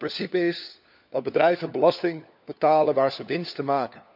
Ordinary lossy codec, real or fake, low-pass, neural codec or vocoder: none; fake; 5.4 kHz; codec, 16 kHz, 4 kbps, FunCodec, trained on LibriTTS, 50 frames a second